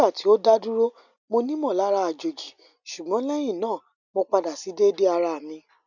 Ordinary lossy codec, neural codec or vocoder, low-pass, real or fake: none; none; 7.2 kHz; real